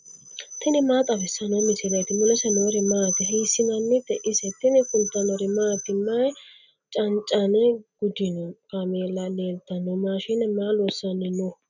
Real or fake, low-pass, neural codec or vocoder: real; 7.2 kHz; none